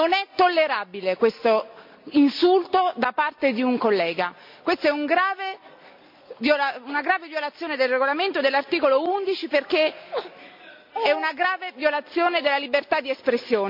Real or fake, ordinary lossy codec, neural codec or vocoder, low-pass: real; MP3, 48 kbps; none; 5.4 kHz